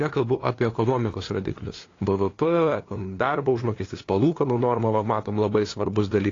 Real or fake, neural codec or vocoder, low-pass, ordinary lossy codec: fake; codec, 16 kHz, 2 kbps, FunCodec, trained on LibriTTS, 25 frames a second; 7.2 kHz; AAC, 32 kbps